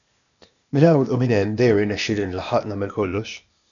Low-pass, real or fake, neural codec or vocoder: 7.2 kHz; fake; codec, 16 kHz, 0.8 kbps, ZipCodec